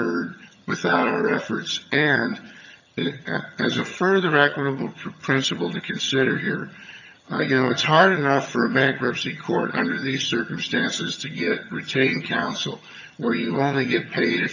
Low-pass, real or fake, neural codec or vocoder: 7.2 kHz; fake; vocoder, 22.05 kHz, 80 mel bands, HiFi-GAN